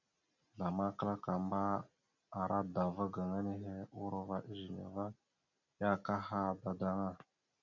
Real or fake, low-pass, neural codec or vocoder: real; 7.2 kHz; none